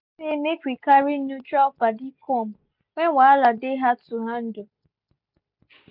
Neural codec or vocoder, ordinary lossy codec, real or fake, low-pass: none; none; real; 5.4 kHz